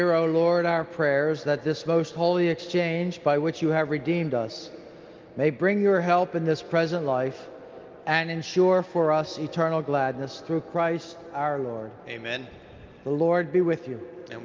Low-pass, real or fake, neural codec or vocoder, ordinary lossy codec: 7.2 kHz; real; none; Opus, 32 kbps